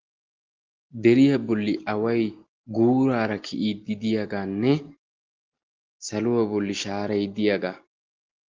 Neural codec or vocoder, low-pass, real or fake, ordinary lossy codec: none; 7.2 kHz; real; Opus, 16 kbps